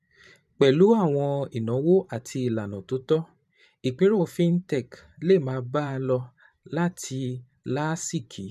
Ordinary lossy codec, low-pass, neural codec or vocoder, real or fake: none; 14.4 kHz; vocoder, 44.1 kHz, 128 mel bands every 512 samples, BigVGAN v2; fake